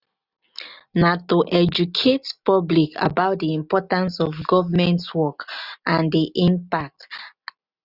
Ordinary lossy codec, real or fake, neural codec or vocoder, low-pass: none; real; none; 5.4 kHz